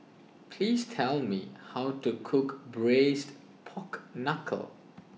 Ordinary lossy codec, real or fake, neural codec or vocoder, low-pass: none; real; none; none